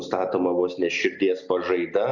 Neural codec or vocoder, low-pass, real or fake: none; 7.2 kHz; real